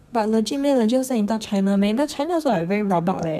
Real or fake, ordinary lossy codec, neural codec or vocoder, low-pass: fake; none; codec, 32 kHz, 1.9 kbps, SNAC; 14.4 kHz